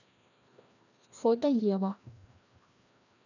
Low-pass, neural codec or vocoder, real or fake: 7.2 kHz; codec, 16 kHz, 1 kbps, FreqCodec, larger model; fake